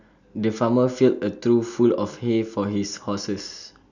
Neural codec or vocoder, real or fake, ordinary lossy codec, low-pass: none; real; none; 7.2 kHz